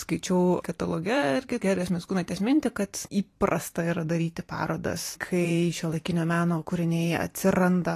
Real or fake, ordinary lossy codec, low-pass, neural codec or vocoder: fake; AAC, 48 kbps; 14.4 kHz; vocoder, 44.1 kHz, 128 mel bands every 512 samples, BigVGAN v2